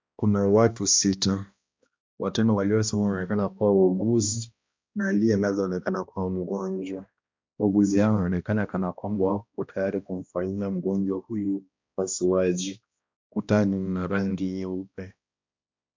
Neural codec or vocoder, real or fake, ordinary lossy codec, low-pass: codec, 16 kHz, 1 kbps, X-Codec, HuBERT features, trained on balanced general audio; fake; MP3, 64 kbps; 7.2 kHz